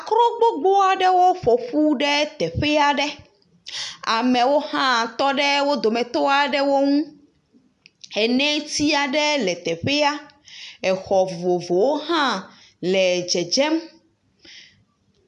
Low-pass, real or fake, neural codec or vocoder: 10.8 kHz; real; none